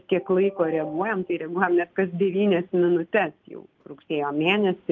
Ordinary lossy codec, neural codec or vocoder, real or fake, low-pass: Opus, 32 kbps; none; real; 7.2 kHz